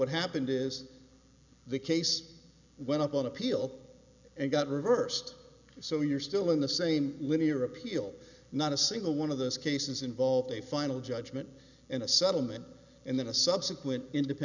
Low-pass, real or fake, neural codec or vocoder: 7.2 kHz; real; none